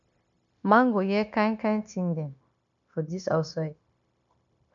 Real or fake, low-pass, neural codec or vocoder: fake; 7.2 kHz; codec, 16 kHz, 0.9 kbps, LongCat-Audio-Codec